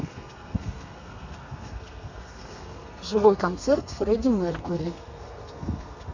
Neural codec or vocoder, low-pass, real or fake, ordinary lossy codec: codec, 32 kHz, 1.9 kbps, SNAC; 7.2 kHz; fake; none